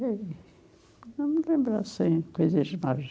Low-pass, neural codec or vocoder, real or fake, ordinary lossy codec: none; none; real; none